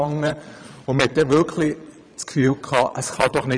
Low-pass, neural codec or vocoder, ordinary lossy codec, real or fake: 9.9 kHz; vocoder, 22.05 kHz, 80 mel bands, Vocos; none; fake